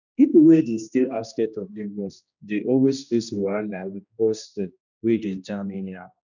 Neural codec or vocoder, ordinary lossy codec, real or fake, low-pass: codec, 16 kHz, 1 kbps, X-Codec, HuBERT features, trained on general audio; none; fake; 7.2 kHz